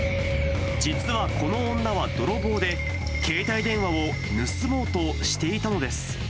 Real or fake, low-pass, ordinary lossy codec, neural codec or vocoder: real; none; none; none